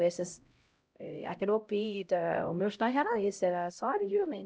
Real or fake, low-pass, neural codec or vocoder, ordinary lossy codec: fake; none; codec, 16 kHz, 0.5 kbps, X-Codec, HuBERT features, trained on LibriSpeech; none